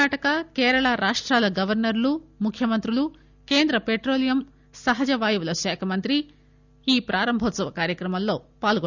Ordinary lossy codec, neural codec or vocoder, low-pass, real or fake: none; none; 7.2 kHz; real